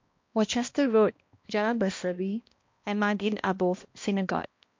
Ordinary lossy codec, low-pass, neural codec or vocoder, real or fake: MP3, 48 kbps; 7.2 kHz; codec, 16 kHz, 1 kbps, X-Codec, HuBERT features, trained on balanced general audio; fake